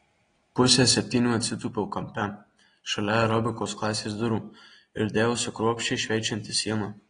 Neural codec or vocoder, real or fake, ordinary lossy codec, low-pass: none; real; AAC, 32 kbps; 9.9 kHz